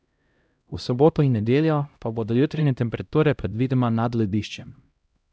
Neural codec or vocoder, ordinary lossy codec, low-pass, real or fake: codec, 16 kHz, 0.5 kbps, X-Codec, HuBERT features, trained on LibriSpeech; none; none; fake